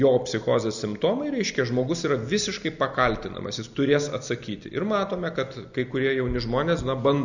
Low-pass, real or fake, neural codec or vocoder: 7.2 kHz; real; none